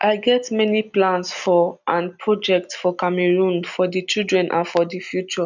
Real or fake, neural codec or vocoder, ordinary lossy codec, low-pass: real; none; none; 7.2 kHz